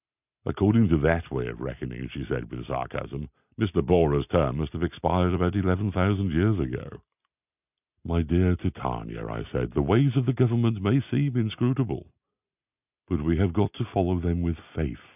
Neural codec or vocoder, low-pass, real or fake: none; 3.6 kHz; real